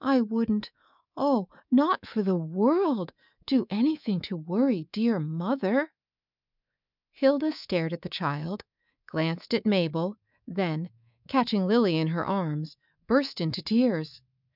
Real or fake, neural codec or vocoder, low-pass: fake; codec, 24 kHz, 3.1 kbps, DualCodec; 5.4 kHz